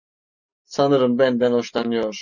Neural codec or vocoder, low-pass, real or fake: none; 7.2 kHz; real